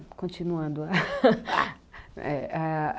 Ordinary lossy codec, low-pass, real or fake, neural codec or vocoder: none; none; real; none